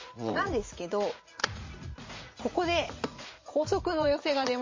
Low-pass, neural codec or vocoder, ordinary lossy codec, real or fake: 7.2 kHz; vocoder, 44.1 kHz, 80 mel bands, Vocos; MP3, 32 kbps; fake